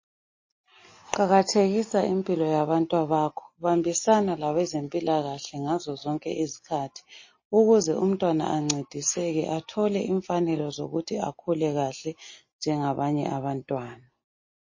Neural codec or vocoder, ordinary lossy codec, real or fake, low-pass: none; MP3, 32 kbps; real; 7.2 kHz